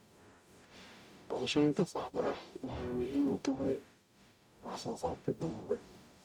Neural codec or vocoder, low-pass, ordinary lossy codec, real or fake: codec, 44.1 kHz, 0.9 kbps, DAC; 19.8 kHz; none; fake